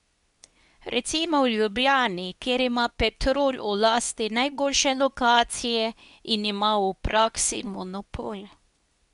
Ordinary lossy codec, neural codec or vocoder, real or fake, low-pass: none; codec, 24 kHz, 0.9 kbps, WavTokenizer, medium speech release version 2; fake; 10.8 kHz